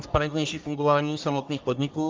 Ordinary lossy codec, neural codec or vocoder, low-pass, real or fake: Opus, 24 kbps; codec, 44.1 kHz, 1.7 kbps, Pupu-Codec; 7.2 kHz; fake